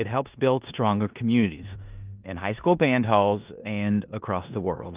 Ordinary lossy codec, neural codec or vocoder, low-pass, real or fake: Opus, 32 kbps; codec, 16 kHz in and 24 kHz out, 0.9 kbps, LongCat-Audio-Codec, four codebook decoder; 3.6 kHz; fake